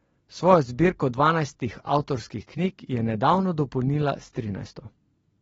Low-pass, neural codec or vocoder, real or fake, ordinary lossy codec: 19.8 kHz; none; real; AAC, 24 kbps